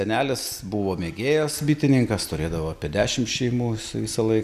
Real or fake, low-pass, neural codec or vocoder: real; 14.4 kHz; none